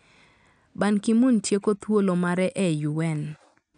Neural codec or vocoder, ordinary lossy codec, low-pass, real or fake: none; none; 9.9 kHz; real